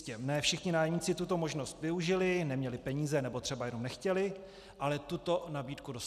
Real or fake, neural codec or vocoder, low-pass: real; none; 14.4 kHz